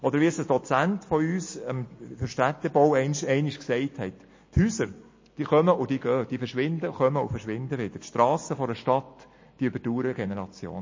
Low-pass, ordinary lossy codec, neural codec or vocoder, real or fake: 7.2 kHz; MP3, 32 kbps; none; real